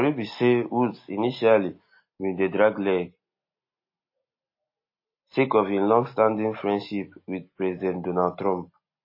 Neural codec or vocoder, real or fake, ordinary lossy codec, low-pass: none; real; MP3, 24 kbps; 5.4 kHz